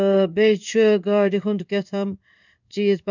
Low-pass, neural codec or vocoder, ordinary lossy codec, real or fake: 7.2 kHz; codec, 16 kHz in and 24 kHz out, 1 kbps, XY-Tokenizer; none; fake